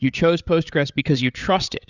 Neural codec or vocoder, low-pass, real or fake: codec, 16 kHz, 8 kbps, FreqCodec, larger model; 7.2 kHz; fake